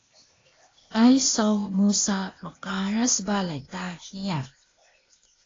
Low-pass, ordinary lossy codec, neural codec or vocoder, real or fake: 7.2 kHz; AAC, 32 kbps; codec, 16 kHz, 0.8 kbps, ZipCodec; fake